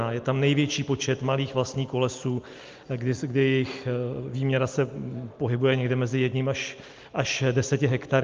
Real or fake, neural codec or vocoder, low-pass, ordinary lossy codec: real; none; 7.2 kHz; Opus, 32 kbps